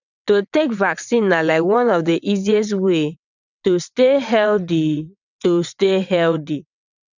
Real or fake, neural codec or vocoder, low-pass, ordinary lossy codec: fake; vocoder, 22.05 kHz, 80 mel bands, WaveNeXt; 7.2 kHz; none